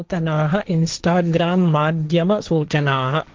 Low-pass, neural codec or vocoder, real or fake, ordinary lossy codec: 7.2 kHz; codec, 16 kHz, 1.1 kbps, Voila-Tokenizer; fake; Opus, 24 kbps